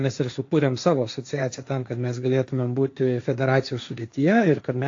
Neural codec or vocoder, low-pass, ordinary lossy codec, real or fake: codec, 16 kHz, 1.1 kbps, Voila-Tokenizer; 7.2 kHz; AAC, 48 kbps; fake